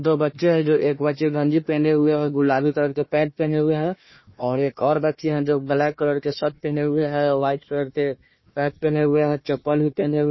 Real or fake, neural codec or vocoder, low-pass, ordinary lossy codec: fake; codec, 16 kHz, 1 kbps, FunCodec, trained on Chinese and English, 50 frames a second; 7.2 kHz; MP3, 24 kbps